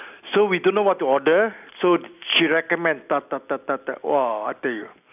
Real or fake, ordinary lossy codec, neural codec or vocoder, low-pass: real; none; none; 3.6 kHz